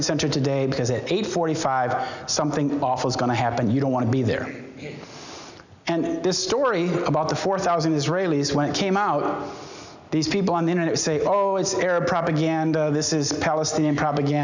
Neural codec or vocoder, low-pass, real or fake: none; 7.2 kHz; real